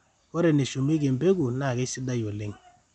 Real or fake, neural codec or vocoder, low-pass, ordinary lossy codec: real; none; 9.9 kHz; none